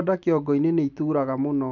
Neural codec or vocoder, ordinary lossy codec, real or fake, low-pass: none; none; real; 7.2 kHz